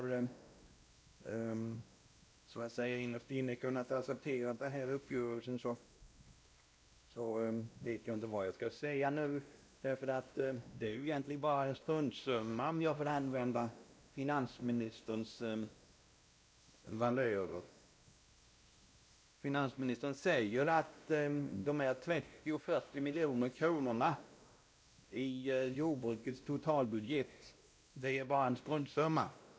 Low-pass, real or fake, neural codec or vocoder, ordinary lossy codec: none; fake; codec, 16 kHz, 1 kbps, X-Codec, WavLM features, trained on Multilingual LibriSpeech; none